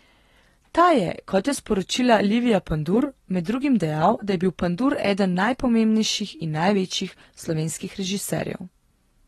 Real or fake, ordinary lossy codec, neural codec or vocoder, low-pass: real; AAC, 32 kbps; none; 14.4 kHz